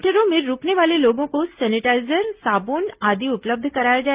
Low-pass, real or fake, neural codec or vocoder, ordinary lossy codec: 3.6 kHz; real; none; Opus, 32 kbps